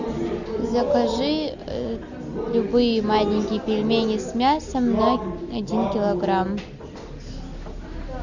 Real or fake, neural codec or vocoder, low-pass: real; none; 7.2 kHz